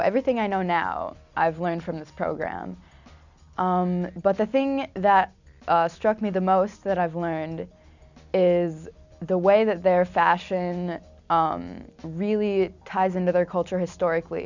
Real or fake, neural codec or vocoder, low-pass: real; none; 7.2 kHz